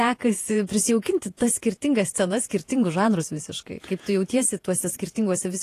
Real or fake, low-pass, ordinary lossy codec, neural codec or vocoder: fake; 14.4 kHz; AAC, 48 kbps; vocoder, 48 kHz, 128 mel bands, Vocos